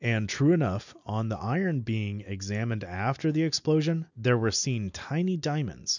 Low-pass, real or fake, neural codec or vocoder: 7.2 kHz; real; none